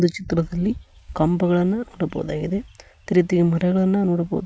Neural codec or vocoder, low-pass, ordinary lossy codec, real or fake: none; none; none; real